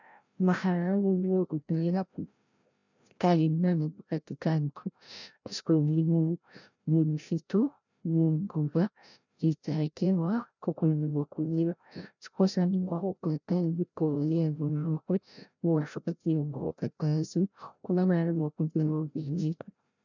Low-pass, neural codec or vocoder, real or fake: 7.2 kHz; codec, 16 kHz, 0.5 kbps, FreqCodec, larger model; fake